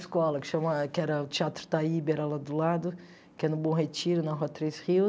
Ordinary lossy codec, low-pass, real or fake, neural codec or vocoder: none; none; real; none